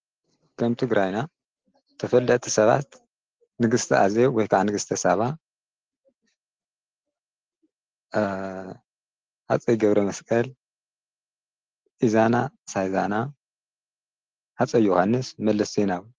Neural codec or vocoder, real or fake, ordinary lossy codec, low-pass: none; real; Opus, 16 kbps; 7.2 kHz